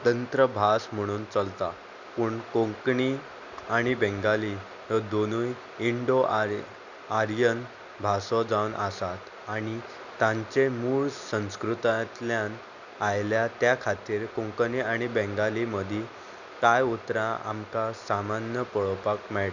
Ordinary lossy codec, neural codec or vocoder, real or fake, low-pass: none; none; real; 7.2 kHz